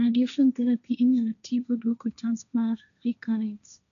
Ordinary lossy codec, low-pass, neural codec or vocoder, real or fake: none; 7.2 kHz; codec, 16 kHz, 1.1 kbps, Voila-Tokenizer; fake